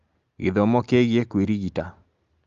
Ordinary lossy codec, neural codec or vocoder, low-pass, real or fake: Opus, 32 kbps; none; 7.2 kHz; real